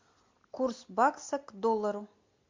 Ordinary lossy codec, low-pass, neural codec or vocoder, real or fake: MP3, 48 kbps; 7.2 kHz; none; real